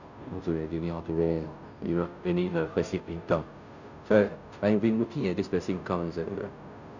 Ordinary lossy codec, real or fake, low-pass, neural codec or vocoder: none; fake; 7.2 kHz; codec, 16 kHz, 0.5 kbps, FunCodec, trained on Chinese and English, 25 frames a second